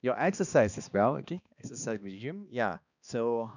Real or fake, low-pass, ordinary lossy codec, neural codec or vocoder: fake; 7.2 kHz; none; codec, 16 kHz, 2 kbps, X-Codec, HuBERT features, trained on balanced general audio